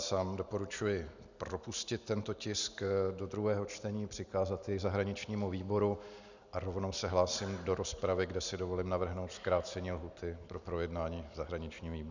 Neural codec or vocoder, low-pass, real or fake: none; 7.2 kHz; real